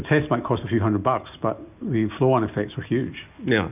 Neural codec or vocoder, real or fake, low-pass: none; real; 3.6 kHz